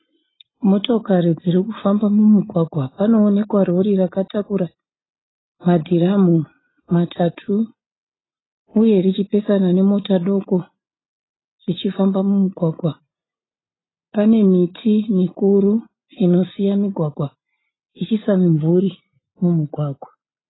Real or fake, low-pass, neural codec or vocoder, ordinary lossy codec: real; 7.2 kHz; none; AAC, 16 kbps